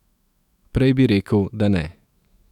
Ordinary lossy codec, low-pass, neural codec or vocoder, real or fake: none; 19.8 kHz; autoencoder, 48 kHz, 128 numbers a frame, DAC-VAE, trained on Japanese speech; fake